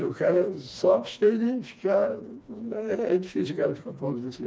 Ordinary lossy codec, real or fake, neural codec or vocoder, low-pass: none; fake; codec, 16 kHz, 2 kbps, FreqCodec, smaller model; none